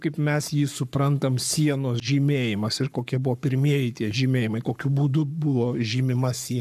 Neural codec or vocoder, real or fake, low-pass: codec, 44.1 kHz, 7.8 kbps, Pupu-Codec; fake; 14.4 kHz